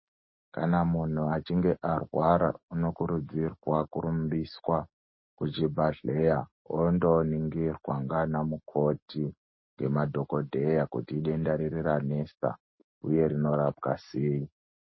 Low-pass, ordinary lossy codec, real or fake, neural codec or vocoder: 7.2 kHz; MP3, 24 kbps; real; none